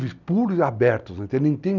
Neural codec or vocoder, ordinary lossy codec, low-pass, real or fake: none; none; 7.2 kHz; real